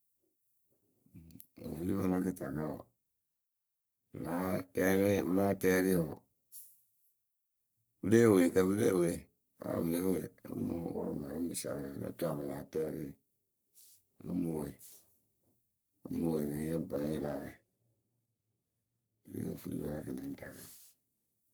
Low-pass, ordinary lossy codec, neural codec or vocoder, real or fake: none; none; codec, 44.1 kHz, 3.4 kbps, Pupu-Codec; fake